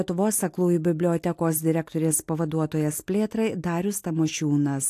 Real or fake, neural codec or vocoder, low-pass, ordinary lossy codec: real; none; 14.4 kHz; AAC, 64 kbps